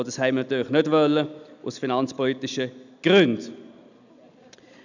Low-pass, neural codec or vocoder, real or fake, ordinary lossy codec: 7.2 kHz; none; real; none